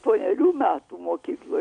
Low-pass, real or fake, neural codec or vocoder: 9.9 kHz; real; none